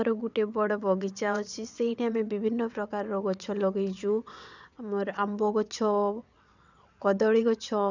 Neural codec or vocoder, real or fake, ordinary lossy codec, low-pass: vocoder, 22.05 kHz, 80 mel bands, WaveNeXt; fake; none; 7.2 kHz